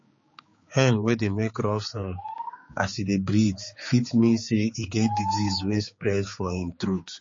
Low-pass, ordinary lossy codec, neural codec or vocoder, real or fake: 7.2 kHz; MP3, 32 kbps; codec, 16 kHz, 4 kbps, X-Codec, HuBERT features, trained on general audio; fake